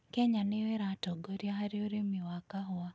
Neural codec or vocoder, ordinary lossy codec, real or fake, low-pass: none; none; real; none